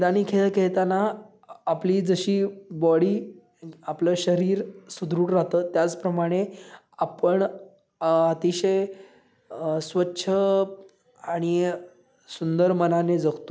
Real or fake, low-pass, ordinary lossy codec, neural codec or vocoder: real; none; none; none